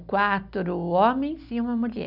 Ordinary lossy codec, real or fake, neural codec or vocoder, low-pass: none; real; none; 5.4 kHz